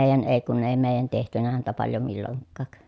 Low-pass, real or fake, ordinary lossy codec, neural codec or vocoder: none; real; none; none